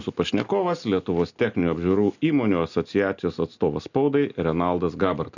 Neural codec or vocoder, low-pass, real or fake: none; 7.2 kHz; real